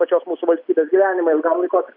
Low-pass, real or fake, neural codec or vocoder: 5.4 kHz; real; none